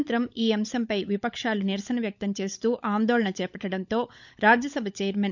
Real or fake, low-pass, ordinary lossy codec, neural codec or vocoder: fake; 7.2 kHz; none; codec, 16 kHz, 16 kbps, FunCodec, trained on LibriTTS, 50 frames a second